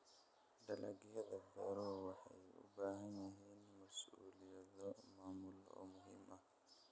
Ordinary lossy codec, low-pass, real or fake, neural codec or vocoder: none; none; real; none